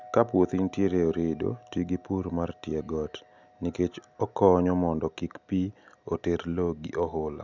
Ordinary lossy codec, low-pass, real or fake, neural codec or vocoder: none; 7.2 kHz; real; none